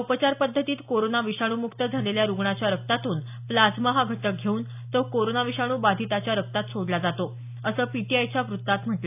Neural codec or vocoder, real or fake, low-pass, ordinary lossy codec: none; real; 3.6 kHz; AAC, 32 kbps